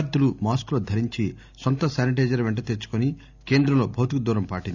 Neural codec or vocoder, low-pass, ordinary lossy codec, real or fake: none; 7.2 kHz; none; real